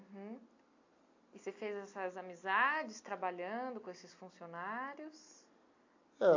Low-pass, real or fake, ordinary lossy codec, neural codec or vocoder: 7.2 kHz; real; AAC, 48 kbps; none